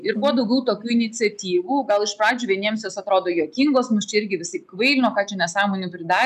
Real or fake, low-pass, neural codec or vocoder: real; 14.4 kHz; none